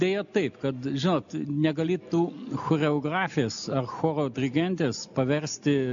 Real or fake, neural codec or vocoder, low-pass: real; none; 7.2 kHz